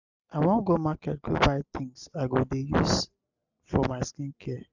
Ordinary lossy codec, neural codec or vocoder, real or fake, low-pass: none; none; real; 7.2 kHz